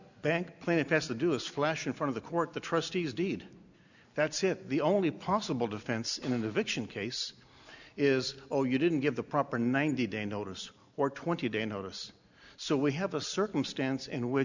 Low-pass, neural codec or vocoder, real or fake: 7.2 kHz; none; real